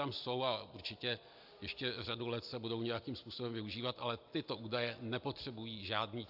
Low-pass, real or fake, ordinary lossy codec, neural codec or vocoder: 5.4 kHz; real; AAC, 48 kbps; none